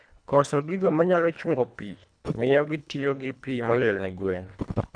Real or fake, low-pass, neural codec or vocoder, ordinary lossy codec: fake; 9.9 kHz; codec, 24 kHz, 1.5 kbps, HILCodec; none